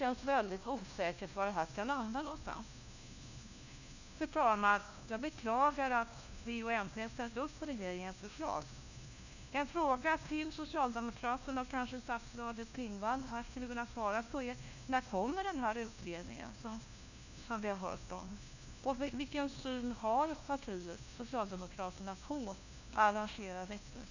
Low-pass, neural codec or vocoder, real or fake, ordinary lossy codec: 7.2 kHz; codec, 16 kHz, 1 kbps, FunCodec, trained on LibriTTS, 50 frames a second; fake; none